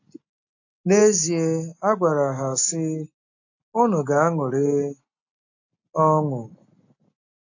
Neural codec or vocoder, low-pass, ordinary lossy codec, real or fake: none; 7.2 kHz; AAC, 48 kbps; real